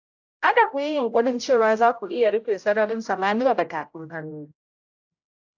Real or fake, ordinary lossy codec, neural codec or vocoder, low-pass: fake; AAC, 48 kbps; codec, 16 kHz, 0.5 kbps, X-Codec, HuBERT features, trained on general audio; 7.2 kHz